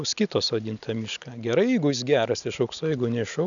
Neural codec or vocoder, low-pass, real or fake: none; 7.2 kHz; real